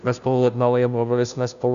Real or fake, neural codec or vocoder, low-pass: fake; codec, 16 kHz, 0.5 kbps, FunCodec, trained on Chinese and English, 25 frames a second; 7.2 kHz